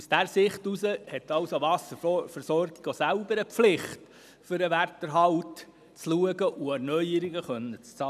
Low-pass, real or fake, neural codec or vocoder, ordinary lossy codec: 14.4 kHz; real; none; none